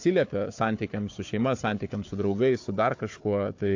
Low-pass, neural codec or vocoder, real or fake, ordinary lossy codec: 7.2 kHz; codec, 16 kHz, 4 kbps, FunCodec, trained on Chinese and English, 50 frames a second; fake; AAC, 48 kbps